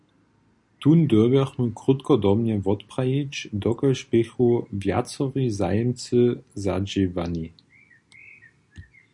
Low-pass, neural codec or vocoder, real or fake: 10.8 kHz; none; real